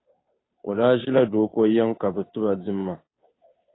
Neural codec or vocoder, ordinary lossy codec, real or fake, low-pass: codec, 16 kHz, 2 kbps, FunCodec, trained on Chinese and English, 25 frames a second; AAC, 16 kbps; fake; 7.2 kHz